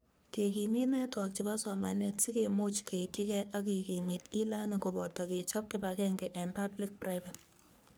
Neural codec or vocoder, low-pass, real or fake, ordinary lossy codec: codec, 44.1 kHz, 3.4 kbps, Pupu-Codec; none; fake; none